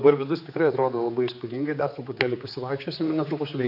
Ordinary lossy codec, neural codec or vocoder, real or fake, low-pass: AAC, 48 kbps; codec, 16 kHz, 4 kbps, X-Codec, HuBERT features, trained on general audio; fake; 5.4 kHz